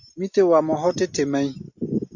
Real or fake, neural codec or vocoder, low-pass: real; none; 7.2 kHz